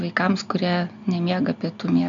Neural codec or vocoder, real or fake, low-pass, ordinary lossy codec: none; real; 7.2 kHz; MP3, 96 kbps